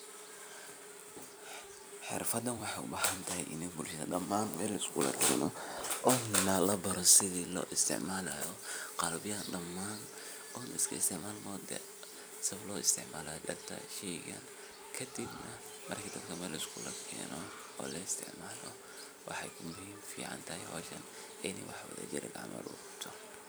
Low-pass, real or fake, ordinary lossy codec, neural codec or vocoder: none; fake; none; vocoder, 44.1 kHz, 128 mel bands every 256 samples, BigVGAN v2